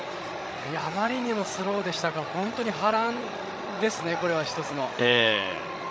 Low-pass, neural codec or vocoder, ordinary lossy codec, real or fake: none; codec, 16 kHz, 16 kbps, FreqCodec, larger model; none; fake